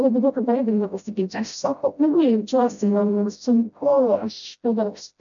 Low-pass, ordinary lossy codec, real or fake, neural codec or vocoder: 7.2 kHz; MP3, 96 kbps; fake; codec, 16 kHz, 0.5 kbps, FreqCodec, smaller model